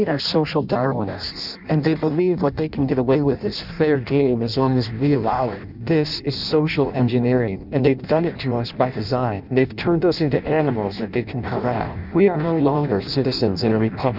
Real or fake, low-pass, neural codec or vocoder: fake; 5.4 kHz; codec, 16 kHz in and 24 kHz out, 0.6 kbps, FireRedTTS-2 codec